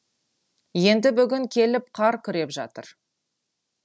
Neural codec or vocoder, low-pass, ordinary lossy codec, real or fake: none; none; none; real